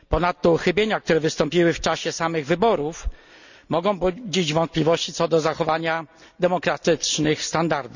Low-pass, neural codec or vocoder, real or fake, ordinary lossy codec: 7.2 kHz; none; real; none